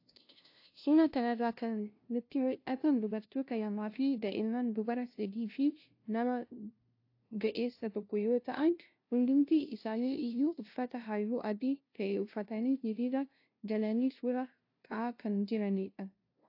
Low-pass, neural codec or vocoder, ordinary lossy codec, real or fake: 5.4 kHz; codec, 16 kHz, 0.5 kbps, FunCodec, trained on LibriTTS, 25 frames a second; AAC, 32 kbps; fake